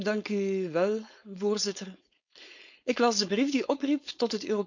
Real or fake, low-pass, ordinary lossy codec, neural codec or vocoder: fake; 7.2 kHz; none; codec, 16 kHz, 4.8 kbps, FACodec